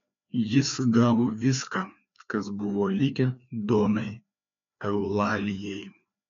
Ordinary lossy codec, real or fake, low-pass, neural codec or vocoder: MP3, 64 kbps; fake; 7.2 kHz; codec, 16 kHz, 2 kbps, FreqCodec, larger model